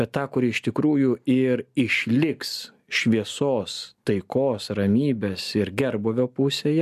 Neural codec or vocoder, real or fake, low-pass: none; real; 14.4 kHz